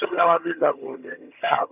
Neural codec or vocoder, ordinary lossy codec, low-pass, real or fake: vocoder, 22.05 kHz, 80 mel bands, HiFi-GAN; none; 3.6 kHz; fake